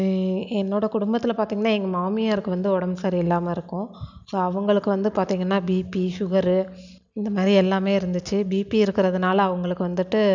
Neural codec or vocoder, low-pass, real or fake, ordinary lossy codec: none; 7.2 kHz; real; none